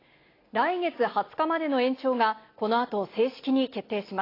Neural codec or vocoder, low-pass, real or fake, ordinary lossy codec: none; 5.4 kHz; real; AAC, 24 kbps